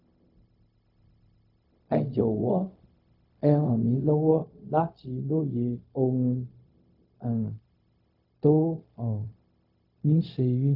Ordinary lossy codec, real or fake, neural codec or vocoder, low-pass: none; fake; codec, 16 kHz, 0.4 kbps, LongCat-Audio-Codec; 5.4 kHz